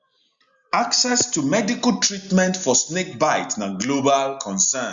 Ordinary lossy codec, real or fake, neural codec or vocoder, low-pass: Opus, 64 kbps; real; none; 7.2 kHz